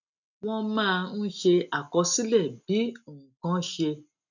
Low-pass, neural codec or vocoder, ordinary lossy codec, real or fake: 7.2 kHz; none; none; real